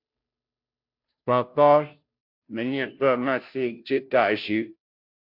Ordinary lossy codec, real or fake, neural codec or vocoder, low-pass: MP3, 48 kbps; fake; codec, 16 kHz, 0.5 kbps, FunCodec, trained on Chinese and English, 25 frames a second; 5.4 kHz